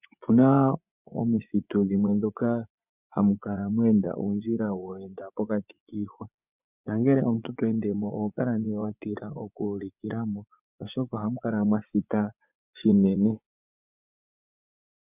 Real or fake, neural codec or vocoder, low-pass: fake; vocoder, 24 kHz, 100 mel bands, Vocos; 3.6 kHz